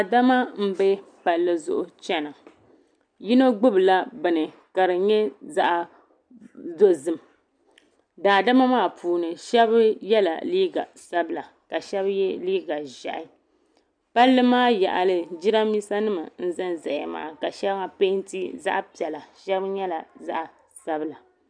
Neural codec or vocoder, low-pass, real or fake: none; 9.9 kHz; real